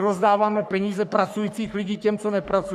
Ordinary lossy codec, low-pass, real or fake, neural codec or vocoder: MP3, 96 kbps; 14.4 kHz; fake; codec, 44.1 kHz, 3.4 kbps, Pupu-Codec